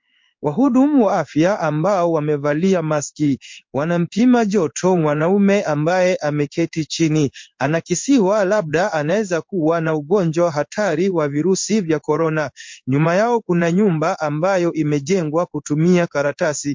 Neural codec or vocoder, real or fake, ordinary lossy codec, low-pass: codec, 16 kHz in and 24 kHz out, 1 kbps, XY-Tokenizer; fake; MP3, 48 kbps; 7.2 kHz